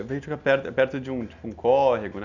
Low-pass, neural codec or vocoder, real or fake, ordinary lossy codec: 7.2 kHz; none; real; none